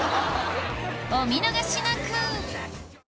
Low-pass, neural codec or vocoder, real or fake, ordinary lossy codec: none; none; real; none